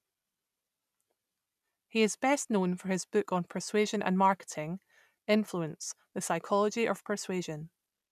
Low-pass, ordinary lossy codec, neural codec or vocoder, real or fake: 14.4 kHz; none; none; real